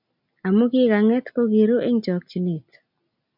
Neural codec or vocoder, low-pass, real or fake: none; 5.4 kHz; real